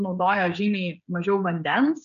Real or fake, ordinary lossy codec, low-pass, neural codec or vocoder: fake; MP3, 64 kbps; 7.2 kHz; codec, 16 kHz, 16 kbps, FreqCodec, smaller model